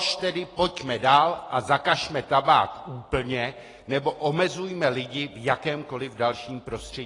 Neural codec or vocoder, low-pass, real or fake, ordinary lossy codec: none; 10.8 kHz; real; AAC, 32 kbps